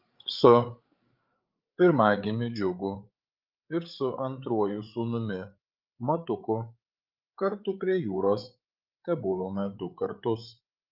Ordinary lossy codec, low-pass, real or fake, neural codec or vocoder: Opus, 32 kbps; 5.4 kHz; fake; codec, 16 kHz, 8 kbps, FreqCodec, larger model